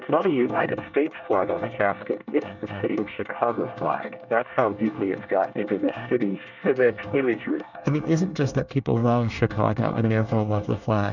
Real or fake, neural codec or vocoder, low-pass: fake; codec, 24 kHz, 1 kbps, SNAC; 7.2 kHz